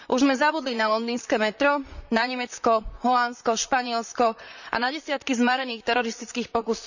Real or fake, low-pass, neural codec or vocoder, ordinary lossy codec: fake; 7.2 kHz; vocoder, 44.1 kHz, 128 mel bands, Pupu-Vocoder; none